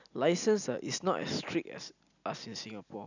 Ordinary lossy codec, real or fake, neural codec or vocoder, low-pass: none; real; none; 7.2 kHz